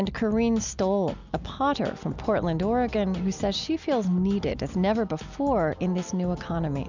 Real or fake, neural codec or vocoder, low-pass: real; none; 7.2 kHz